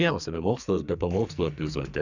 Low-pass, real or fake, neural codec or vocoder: 7.2 kHz; fake; codec, 44.1 kHz, 2.6 kbps, SNAC